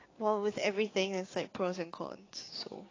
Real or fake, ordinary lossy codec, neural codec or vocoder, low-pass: fake; AAC, 32 kbps; codec, 16 kHz, 6 kbps, DAC; 7.2 kHz